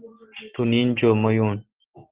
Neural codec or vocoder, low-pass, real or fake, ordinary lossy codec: none; 3.6 kHz; real; Opus, 16 kbps